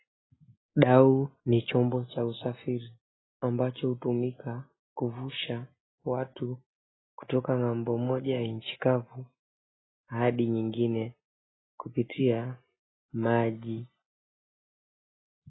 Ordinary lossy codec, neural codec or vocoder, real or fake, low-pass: AAC, 16 kbps; none; real; 7.2 kHz